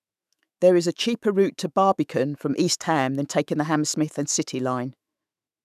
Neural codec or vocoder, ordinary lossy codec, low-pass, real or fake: autoencoder, 48 kHz, 128 numbers a frame, DAC-VAE, trained on Japanese speech; none; 14.4 kHz; fake